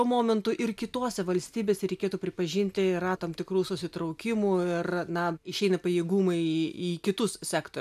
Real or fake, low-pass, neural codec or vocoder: real; 14.4 kHz; none